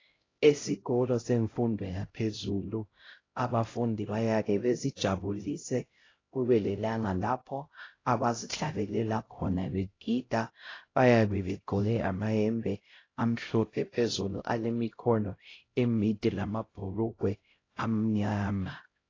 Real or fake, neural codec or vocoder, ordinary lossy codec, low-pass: fake; codec, 16 kHz, 0.5 kbps, X-Codec, HuBERT features, trained on LibriSpeech; AAC, 32 kbps; 7.2 kHz